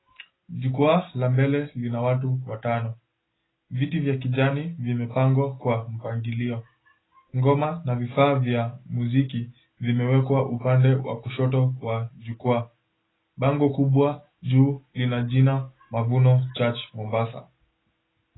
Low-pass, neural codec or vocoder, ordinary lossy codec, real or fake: 7.2 kHz; none; AAC, 16 kbps; real